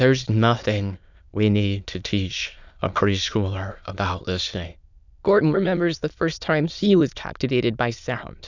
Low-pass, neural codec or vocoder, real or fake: 7.2 kHz; autoencoder, 22.05 kHz, a latent of 192 numbers a frame, VITS, trained on many speakers; fake